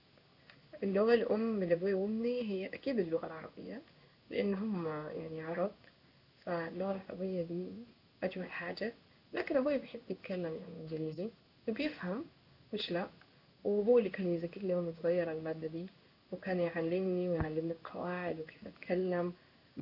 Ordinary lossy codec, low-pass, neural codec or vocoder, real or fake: none; 5.4 kHz; codec, 16 kHz in and 24 kHz out, 1 kbps, XY-Tokenizer; fake